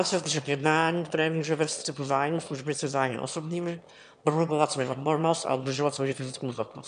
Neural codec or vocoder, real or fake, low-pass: autoencoder, 22.05 kHz, a latent of 192 numbers a frame, VITS, trained on one speaker; fake; 9.9 kHz